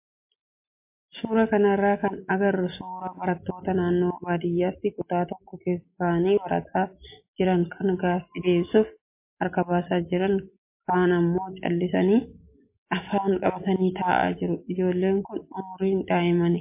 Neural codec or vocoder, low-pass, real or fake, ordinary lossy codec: none; 3.6 kHz; real; MP3, 24 kbps